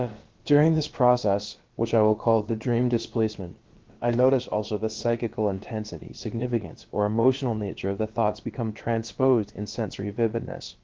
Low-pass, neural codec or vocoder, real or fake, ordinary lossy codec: 7.2 kHz; codec, 16 kHz, about 1 kbps, DyCAST, with the encoder's durations; fake; Opus, 16 kbps